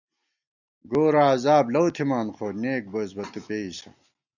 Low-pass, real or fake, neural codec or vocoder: 7.2 kHz; real; none